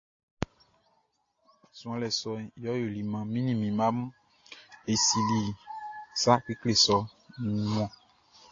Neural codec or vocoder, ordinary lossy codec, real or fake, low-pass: none; AAC, 32 kbps; real; 7.2 kHz